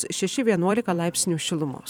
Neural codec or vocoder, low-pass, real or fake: vocoder, 44.1 kHz, 128 mel bands every 256 samples, BigVGAN v2; 19.8 kHz; fake